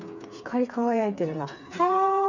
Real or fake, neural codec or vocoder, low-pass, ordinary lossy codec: fake; codec, 16 kHz, 4 kbps, FreqCodec, smaller model; 7.2 kHz; none